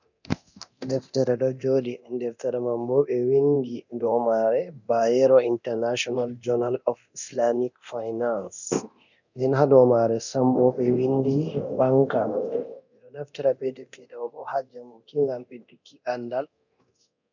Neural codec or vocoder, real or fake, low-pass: codec, 24 kHz, 0.9 kbps, DualCodec; fake; 7.2 kHz